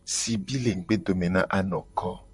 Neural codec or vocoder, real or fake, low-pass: vocoder, 44.1 kHz, 128 mel bands, Pupu-Vocoder; fake; 10.8 kHz